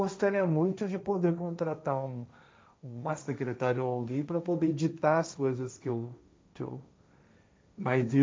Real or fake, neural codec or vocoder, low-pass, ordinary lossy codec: fake; codec, 16 kHz, 1.1 kbps, Voila-Tokenizer; none; none